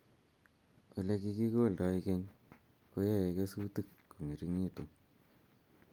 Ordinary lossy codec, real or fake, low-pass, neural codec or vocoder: Opus, 32 kbps; real; 19.8 kHz; none